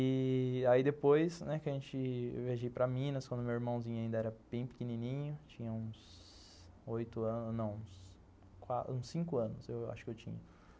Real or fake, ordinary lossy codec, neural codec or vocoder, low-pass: real; none; none; none